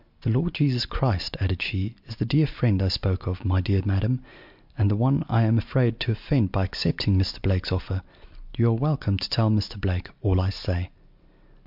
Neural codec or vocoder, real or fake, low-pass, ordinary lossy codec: none; real; 5.4 kHz; MP3, 48 kbps